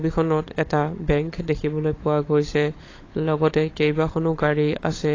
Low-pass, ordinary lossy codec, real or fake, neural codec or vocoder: 7.2 kHz; AAC, 32 kbps; fake; codec, 16 kHz, 16 kbps, FunCodec, trained on LibriTTS, 50 frames a second